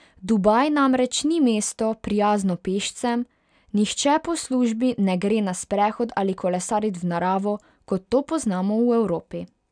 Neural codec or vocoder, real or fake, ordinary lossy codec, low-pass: none; real; none; 9.9 kHz